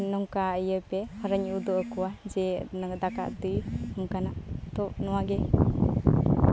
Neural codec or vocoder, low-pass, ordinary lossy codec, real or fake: none; none; none; real